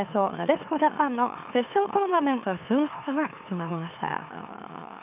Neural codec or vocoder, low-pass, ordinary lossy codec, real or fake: autoencoder, 44.1 kHz, a latent of 192 numbers a frame, MeloTTS; 3.6 kHz; none; fake